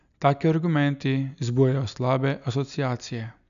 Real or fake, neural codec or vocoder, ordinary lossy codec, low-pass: real; none; none; 7.2 kHz